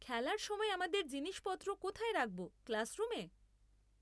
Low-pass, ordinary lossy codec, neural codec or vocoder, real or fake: none; none; none; real